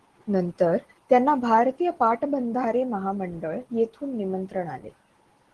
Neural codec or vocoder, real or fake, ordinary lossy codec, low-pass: none; real; Opus, 16 kbps; 10.8 kHz